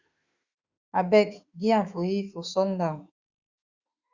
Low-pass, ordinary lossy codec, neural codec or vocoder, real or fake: 7.2 kHz; Opus, 64 kbps; autoencoder, 48 kHz, 32 numbers a frame, DAC-VAE, trained on Japanese speech; fake